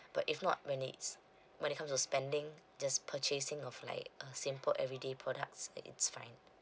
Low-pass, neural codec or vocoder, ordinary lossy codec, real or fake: none; none; none; real